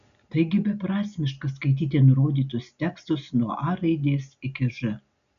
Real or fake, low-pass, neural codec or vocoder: real; 7.2 kHz; none